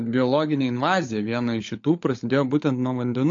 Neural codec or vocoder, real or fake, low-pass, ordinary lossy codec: codec, 16 kHz, 16 kbps, FunCodec, trained on LibriTTS, 50 frames a second; fake; 7.2 kHz; AAC, 48 kbps